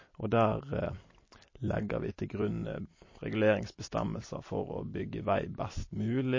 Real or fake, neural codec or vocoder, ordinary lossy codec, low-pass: fake; vocoder, 44.1 kHz, 128 mel bands every 256 samples, BigVGAN v2; MP3, 32 kbps; 7.2 kHz